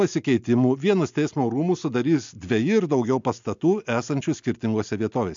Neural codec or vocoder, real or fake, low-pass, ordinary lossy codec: none; real; 7.2 kHz; MP3, 64 kbps